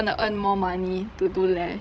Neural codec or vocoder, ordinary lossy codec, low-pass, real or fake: codec, 16 kHz, 16 kbps, FreqCodec, larger model; none; none; fake